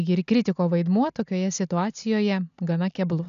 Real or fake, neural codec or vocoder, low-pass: real; none; 7.2 kHz